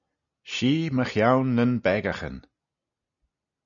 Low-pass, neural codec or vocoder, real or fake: 7.2 kHz; none; real